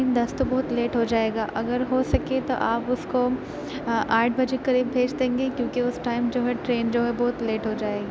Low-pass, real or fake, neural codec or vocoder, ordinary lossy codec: none; real; none; none